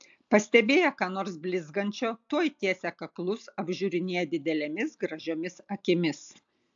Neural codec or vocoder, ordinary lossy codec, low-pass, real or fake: none; AAC, 64 kbps; 7.2 kHz; real